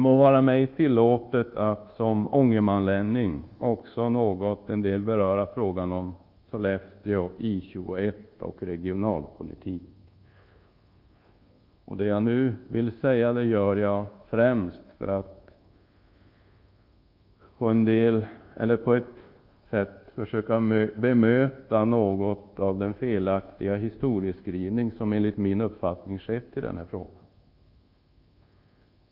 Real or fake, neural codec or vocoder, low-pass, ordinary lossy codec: fake; codec, 24 kHz, 1.2 kbps, DualCodec; 5.4 kHz; Opus, 24 kbps